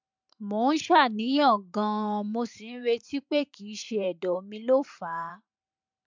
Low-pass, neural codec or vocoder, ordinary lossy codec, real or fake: 7.2 kHz; vocoder, 44.1 kHz, 128 mel bands every 512 samples, BigVGAN v2; MP3, 64 kbps; fake